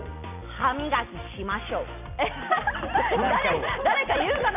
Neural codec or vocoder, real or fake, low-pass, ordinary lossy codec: none; real; 3.6 kHz; none